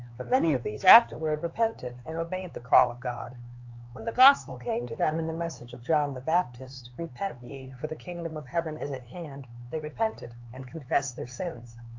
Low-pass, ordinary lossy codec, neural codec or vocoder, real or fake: 7.2 kHz; AAC, 48 kbps; codec, 16 kHz, 4 kbps, X-Codec, HuBERT features, trained on LibriSpeech; fake